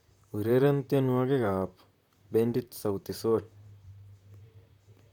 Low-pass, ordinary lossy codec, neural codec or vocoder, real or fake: 19.8 kHz; none; vocoder, 44.1 kHz, 128 mel bands, Pupu-Vocoder; fake